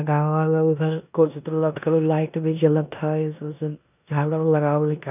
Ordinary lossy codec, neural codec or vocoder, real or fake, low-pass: none; codec, 16 kHz in and 24 kHz out, 0.9 kbps, LongCat-Audio-Codec, four codebook decoder; fake; 3.6 kHz